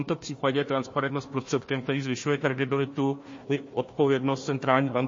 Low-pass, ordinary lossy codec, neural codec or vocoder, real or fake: 7.2 kHz; MP3, 32 kbps; codec, 16 kHz, 1 kbps, FunCodec, trained on Chinese and English, 50 frames a second; fake